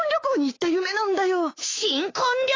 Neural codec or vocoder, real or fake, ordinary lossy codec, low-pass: codec, 16 kHz, 6 kbps, DAC; fake; AAC, 32 kbps; 7.2 kHz